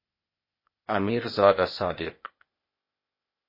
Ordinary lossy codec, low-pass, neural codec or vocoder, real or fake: MP3, 24 kbps; 5.4 kHz; codec, 16 kHz, 0.8 kbps, ZipCodec; fake